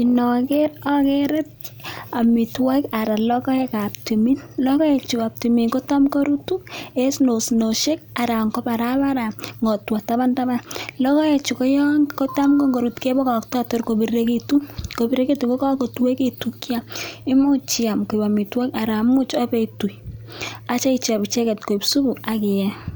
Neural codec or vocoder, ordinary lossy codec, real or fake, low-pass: none; none; real; none